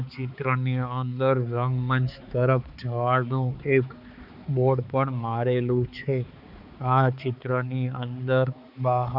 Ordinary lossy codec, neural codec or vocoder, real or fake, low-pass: none; codec, 16 kHz, 4 kbps, X-Codec, HuBERT features, trained on balanced general audio; fake; 5.4 kHz